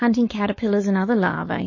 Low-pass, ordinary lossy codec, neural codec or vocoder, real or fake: 7.2 kHz; MP3, 32 kbps; codec, 16 kHz, 4.8 kbps, FACodec; fake